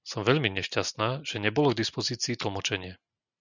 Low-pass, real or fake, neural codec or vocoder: 7.2 kHz; real; none